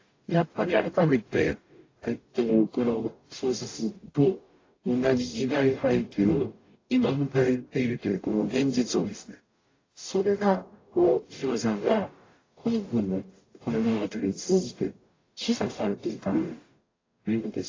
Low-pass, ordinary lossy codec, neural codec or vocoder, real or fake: 7.2 kHz; AAC, 32 kbps; codec, 44.1 kHz, 0.9 kbps, DAC; fake